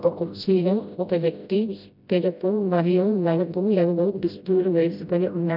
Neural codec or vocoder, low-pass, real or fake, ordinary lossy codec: codec, 16 kHz, 0.5 kbps, FreqCodec, smaller model; 5.4 kHz; fake; none